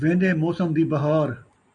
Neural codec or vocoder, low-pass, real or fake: none; 9.9 kHz; real